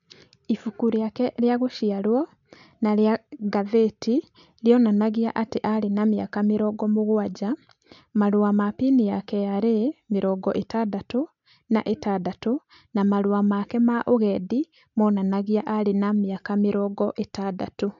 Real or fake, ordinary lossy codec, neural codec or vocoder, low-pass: real; none; none; 7.2 kHz